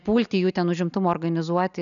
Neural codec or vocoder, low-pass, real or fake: none; 7.2 kHz; real